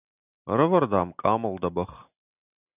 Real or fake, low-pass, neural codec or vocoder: real; 3.6 kHz; none